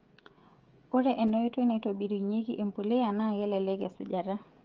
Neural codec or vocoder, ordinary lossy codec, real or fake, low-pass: codec, 16 kHz, 16 kbps, FreqCodec, smaller model; Opus, 24 kbps; fake; 7.2 kHz